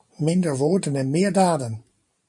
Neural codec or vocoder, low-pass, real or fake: vocoder, 44.1 kHz, 128 mel bands every 512 samples, BigVGAN v2; 10.8 kHz; fake